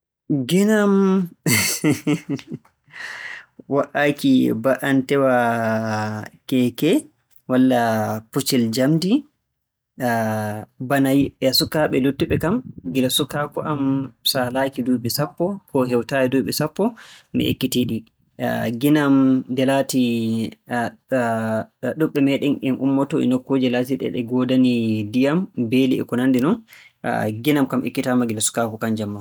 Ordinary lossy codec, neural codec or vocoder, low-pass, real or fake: none; none; none; real